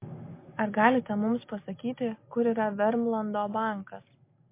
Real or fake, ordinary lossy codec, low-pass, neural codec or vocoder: real; MP3, 24 kbps; 3.6 kHz; none